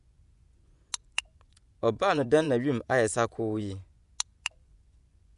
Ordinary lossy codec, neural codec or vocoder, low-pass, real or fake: none; vocoder, 24 kHz, 100 mel bands, Vocos; 10.8 kHz; fake